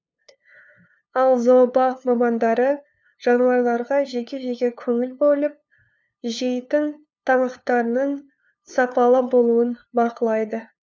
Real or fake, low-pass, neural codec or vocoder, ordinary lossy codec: fake; none; codec, 16 kHz, 2 kbps, FunCodec, trained on LibriTTS, 25 frames a second; none